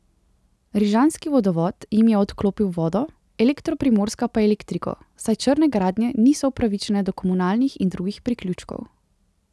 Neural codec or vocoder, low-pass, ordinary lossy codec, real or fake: none; none; none; real